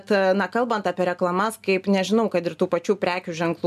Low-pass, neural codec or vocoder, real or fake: 14.4 kHz; none; real